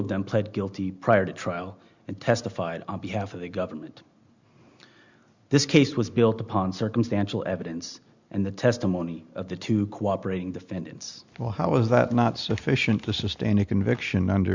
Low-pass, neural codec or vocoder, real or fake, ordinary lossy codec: 7.2 kHz; none; real; Opus, 64 kbps